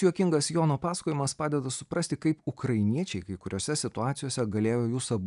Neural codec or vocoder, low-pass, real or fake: none; 10.8 kHz; real